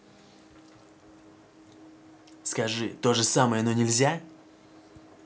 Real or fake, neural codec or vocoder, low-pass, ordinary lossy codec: real; none; none; none